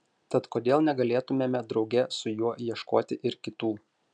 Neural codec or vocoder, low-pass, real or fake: none; 9.9 kHz; real